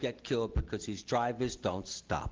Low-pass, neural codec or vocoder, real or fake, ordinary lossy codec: 7.2 kHz; none; real; Opus, 16 kbps